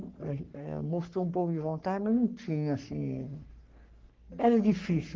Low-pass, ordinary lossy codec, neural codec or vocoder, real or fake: 7.2 kHz; Opus, 16 kbps; codec, 44.1 kHz, 3.4 kbps, Pupu-Codec; fake